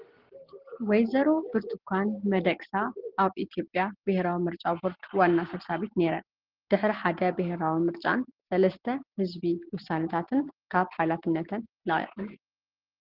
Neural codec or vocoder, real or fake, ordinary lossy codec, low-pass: none; real; Opus, 16 kbps; 5.4 kHz